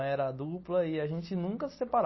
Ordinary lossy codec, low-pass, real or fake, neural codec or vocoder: MP3, 24 kbps; 7.2 kHz; real; none